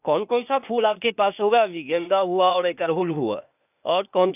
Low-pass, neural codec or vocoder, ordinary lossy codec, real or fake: 3.6 kHz; codec, 16 kHz, 0.8 kbps, ZipCodec; none; fake